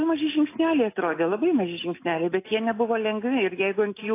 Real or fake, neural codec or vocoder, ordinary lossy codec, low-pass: real; none; AAC, 24 kbps; 3.6 kHz